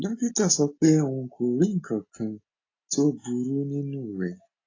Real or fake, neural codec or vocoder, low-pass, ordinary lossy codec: real; none; 7.2 kHz; AAC, 32 kbps